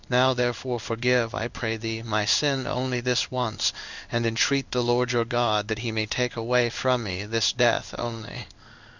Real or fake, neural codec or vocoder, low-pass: fake; codec, 16 kHz in and 24 kHz out, 1 kbps, XY-Tokenizer; 7.2 kHz